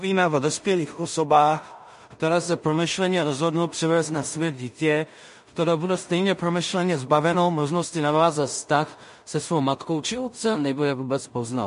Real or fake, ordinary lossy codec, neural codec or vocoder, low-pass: fake; MP3, 48 kbps; codec, 16 kHz in and 24 kHz out, 0.4 kbps, LongCat-Audio-Codec, two codebook decoder; 10.8 kHz